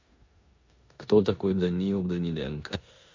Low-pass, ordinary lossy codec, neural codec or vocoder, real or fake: 7.2 kHz; MP3, 48 kbps; codec, 16 kHz in and 24 kHz out, 0.9 kbps, LongCat-Audio-Codec, four codebook decoder; fake